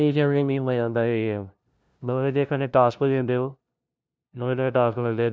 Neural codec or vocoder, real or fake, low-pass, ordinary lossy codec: codec, 16 kHz, 0.5 kbps, FunCodec, trained on LibriTTS, 25 frames a second; fake; none; none